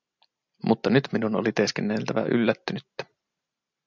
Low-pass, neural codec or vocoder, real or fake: 7.2 kHz; none; real